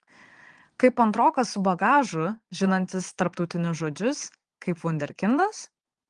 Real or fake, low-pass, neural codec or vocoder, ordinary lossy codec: fake; 9.9 kHz; vocoder, 22.05 kHz, 80 mel bands, Vocos; Opus, 24 kbps